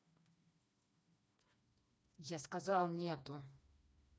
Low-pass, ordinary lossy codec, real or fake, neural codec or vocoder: none; none; fake; codec, 16 kHz, 2 kbps, FreqCodec, smaller model